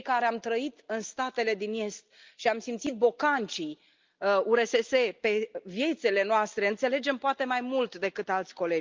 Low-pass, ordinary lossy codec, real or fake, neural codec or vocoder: 7.2 kHz; Opus, 24 kbps; real; none